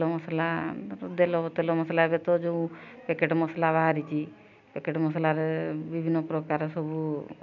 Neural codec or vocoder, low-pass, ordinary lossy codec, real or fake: none; 7.2 kHz; none; real